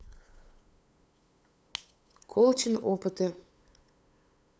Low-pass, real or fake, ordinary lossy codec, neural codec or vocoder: none; fake; none; codec, 16 kHz, 8 kbps, FunCodec, trained on LibriTTS, 25 frames a second